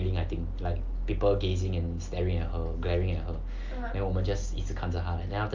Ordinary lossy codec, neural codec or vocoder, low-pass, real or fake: Opus, 32 kbps; none; 7.2 kHz; real